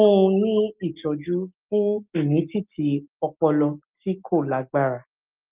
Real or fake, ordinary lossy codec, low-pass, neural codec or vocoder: real; Opus, 24 kbps; 3.6 kHz; none